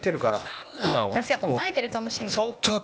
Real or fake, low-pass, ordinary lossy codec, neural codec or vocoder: fake; none; none; codec, 16 kHz, 0.8 kbps, ZipCodec